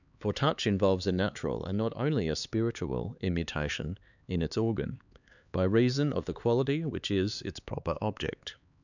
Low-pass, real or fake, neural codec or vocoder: 7.2 kHz; fake; codec, 16 kHz, 4 kbps, X-Codec, HuBERT features, trained on LibriSpeech